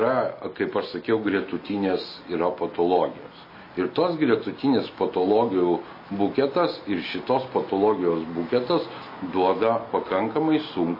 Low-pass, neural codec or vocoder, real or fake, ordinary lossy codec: 5.4 kHz; none; real; MP3, 24 kbps